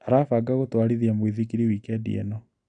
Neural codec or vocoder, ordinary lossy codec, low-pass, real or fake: none; none; 9.9 kHz; real